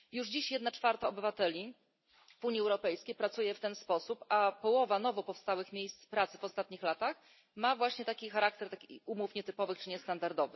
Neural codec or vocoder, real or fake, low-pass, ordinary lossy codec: none; real; 7.2 kHz; MP3, 24 kbps